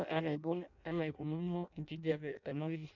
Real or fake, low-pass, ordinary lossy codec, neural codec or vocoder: fake; 7.2 kHz; none; codec, 16 kHz in and 24 kHz out, 0.6 kbps, FireRedTTS-2 codec